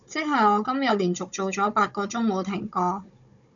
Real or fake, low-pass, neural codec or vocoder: fake; 7.2 kHz; codec, 16 kHz, 16 kbps, FunCodec, trained on Chinese and English, 50 frames a second